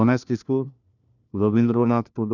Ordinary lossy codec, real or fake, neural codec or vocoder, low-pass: none; fake; codec, 16 kHz, 1 kbps, FunCodec, trained on LibriTTS, 50 frames a second; 7.2 kHz